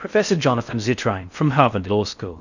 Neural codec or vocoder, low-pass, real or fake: codec, 16 kHz in and 24 kHz out, 0.6 kbps, FocalCodec, streaming, 4096 codes; 7.2 kHz; fake